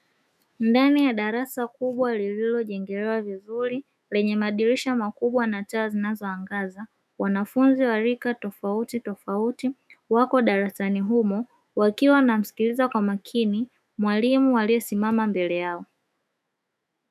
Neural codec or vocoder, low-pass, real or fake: autoencoder, 48 kHz, 128 numbers a frame, DAC-VAE, trained on Japanese speech; 14.4 kHz; fake